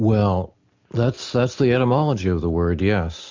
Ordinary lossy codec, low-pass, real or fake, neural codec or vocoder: MP3, 64 kbps; 7.2 kHz; real; none